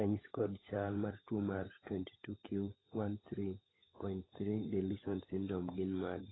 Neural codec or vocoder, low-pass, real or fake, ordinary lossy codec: codec, 16 kHz, 16 kbps, FunCodec, trained on LibriTTS, 50 frames a second; 7.2 kHz; fake; AAC, 16 kbps